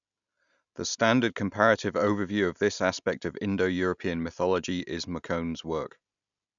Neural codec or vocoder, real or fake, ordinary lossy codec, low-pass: none; real; none; 7.2 kHz